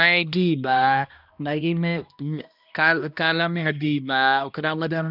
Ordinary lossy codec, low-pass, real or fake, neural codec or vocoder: none; 5.4 kHz; fake; codec, 16 kHz, 1 kbps, X-Codec, HuBERT features, trained on balanced general audio